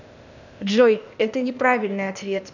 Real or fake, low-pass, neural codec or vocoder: fake; 7.2 kHz; codec, 16 kHz, 0.8 kbps, ZipCodec